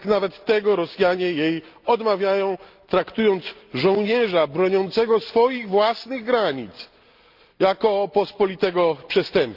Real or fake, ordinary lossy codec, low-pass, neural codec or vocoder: real; Opus, 32 kbps; 5.4 kHz; none